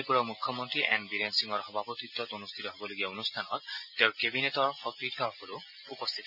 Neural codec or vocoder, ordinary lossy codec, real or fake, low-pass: none; none; real; 5.4 kHz